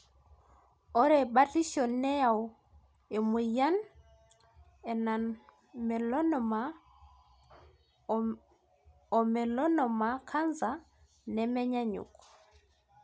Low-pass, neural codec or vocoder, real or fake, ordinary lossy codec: none; none; real; none